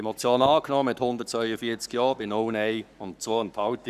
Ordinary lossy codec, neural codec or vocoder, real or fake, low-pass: none; codec, 44.1 kHz, 7.8 kbps, Pupu-Codec; fake; 14.4 kHz